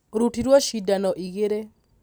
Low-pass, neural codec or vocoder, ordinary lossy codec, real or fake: none; none; none; real